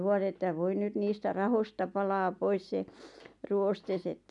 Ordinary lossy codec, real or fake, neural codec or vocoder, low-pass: none; real; none; 10.8 kHz